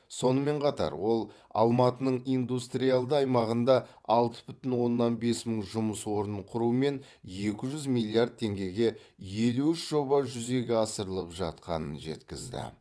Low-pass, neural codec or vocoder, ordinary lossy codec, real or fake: none; vocoder, 22.05 kHz, 80 mel bands, WaveNeXt; none; fake